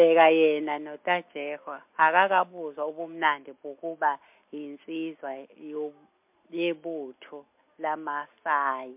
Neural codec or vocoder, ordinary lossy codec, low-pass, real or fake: none; MP3, 32 kbps; 3.6 kHz; real